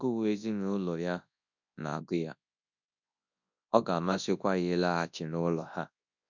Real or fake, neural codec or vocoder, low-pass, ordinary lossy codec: fake; codec, 24 kHz, 0.9 kbps, WavTokenizer, large speech release; 7.2 kHz; none